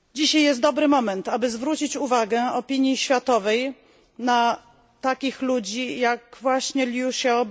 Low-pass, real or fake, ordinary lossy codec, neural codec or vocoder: none; real; none; none